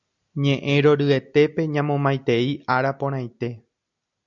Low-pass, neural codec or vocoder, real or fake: 7.2 kHz; none; real